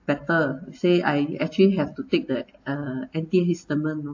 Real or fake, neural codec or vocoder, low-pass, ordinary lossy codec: real; none; 7.2 kHz; AAC, 48 kbps